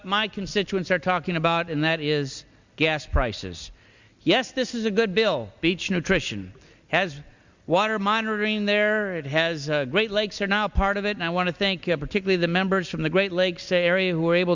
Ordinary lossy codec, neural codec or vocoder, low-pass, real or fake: Opus, 64 kbps; none; 7.2 kHz; real